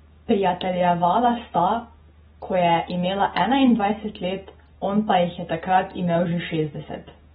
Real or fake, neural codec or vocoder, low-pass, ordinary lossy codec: real; none; 19.8 kHz; AAC, 16 kbps